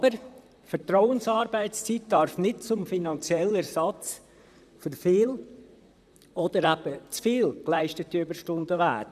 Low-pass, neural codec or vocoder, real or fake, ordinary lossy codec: 14.4 kHz; vocoder, 44.1 kHz, 128 mel bands, Pupu-Vocoder; fake; none